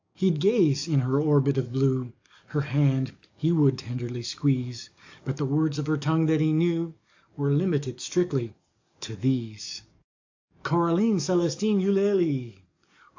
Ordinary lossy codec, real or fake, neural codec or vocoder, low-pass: AAC, 48 kbps; fake; codec, 44.1 kHz, 7.8 kbps, DAC; 7.2 kHz